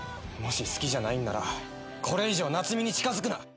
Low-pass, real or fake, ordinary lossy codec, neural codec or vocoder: none; real; none; none